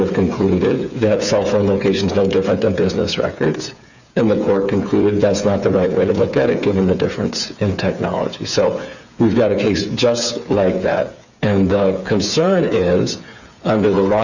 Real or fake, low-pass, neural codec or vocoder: fake; 7.2 kHz; codec, 16 kHz, 8 kbps, FreqCodec, smaller model